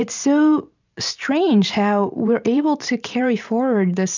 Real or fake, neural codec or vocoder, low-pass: fake; vocoder, 44.1 kHz, 128 mel bands every 512 samples, BigVGAN v2; 7.2 kHz